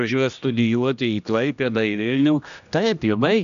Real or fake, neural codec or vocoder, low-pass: fake; codec, 16 kHz, 1 kbps, X-Codec, HuBERT features, trained on general audio; 7.2 kHz